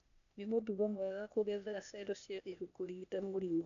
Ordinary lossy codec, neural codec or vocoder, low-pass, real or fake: none; codec, 16 kHz, 0.8 kbps, ZipCodec; 7.2 kHz; fake